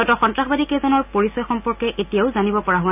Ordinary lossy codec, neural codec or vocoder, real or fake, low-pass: none; none; real; 3.6 kHz